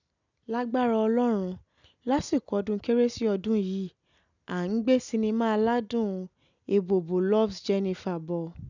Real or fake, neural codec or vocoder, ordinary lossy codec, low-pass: real; none; none; 7.2 kHz